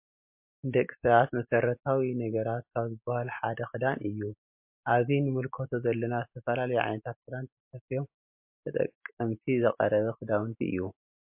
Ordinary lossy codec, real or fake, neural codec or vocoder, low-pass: MP3, 32 kbps; real; none; 3.6 kHz